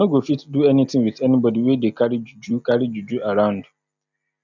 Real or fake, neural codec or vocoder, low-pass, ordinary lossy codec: real; none; 7.2 kHz; none